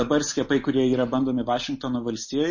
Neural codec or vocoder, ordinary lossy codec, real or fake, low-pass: none; MP3, 32 kbps; real; 7.2 kHz